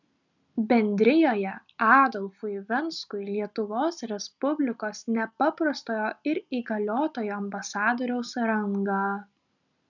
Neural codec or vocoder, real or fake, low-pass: none; real; 7.2 kHz